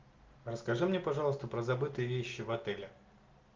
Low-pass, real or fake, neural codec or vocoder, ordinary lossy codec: 7.2 kHz; real; none; Opus, 32 kbps